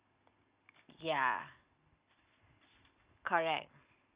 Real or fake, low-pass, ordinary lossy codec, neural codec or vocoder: real; 3.6 kHz; Opus, 64 kbps; none